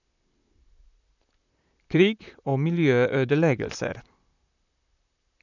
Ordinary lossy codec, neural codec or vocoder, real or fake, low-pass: none; vocoder, 44.1 kHz, 128 mel bands, Pupu-Vocoder; fake; 7.2 kHz